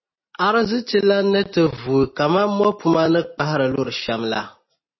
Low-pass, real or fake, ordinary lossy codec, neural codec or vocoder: 7.2 kHz; real; MP3, 24 kbps; none